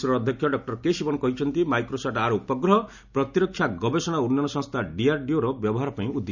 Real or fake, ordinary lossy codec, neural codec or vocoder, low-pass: real; none; none; 7.2 kHz